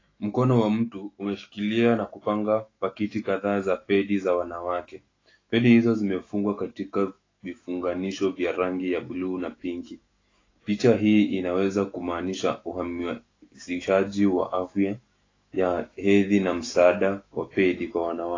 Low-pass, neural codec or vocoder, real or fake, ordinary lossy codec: 7.2 kHz; autoencoder, 48 kHz, 128 numbers a frame, DAC-VAE, trained on Japanese speech; fake; AAC, 32 kbps